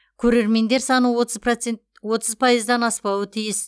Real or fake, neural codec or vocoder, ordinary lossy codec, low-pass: real; none; none; none